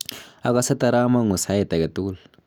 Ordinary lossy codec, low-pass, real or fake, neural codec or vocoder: none; none; real; none